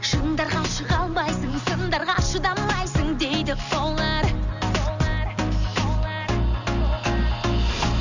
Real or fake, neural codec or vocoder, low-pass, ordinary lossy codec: real; none; 7.2 kHz; none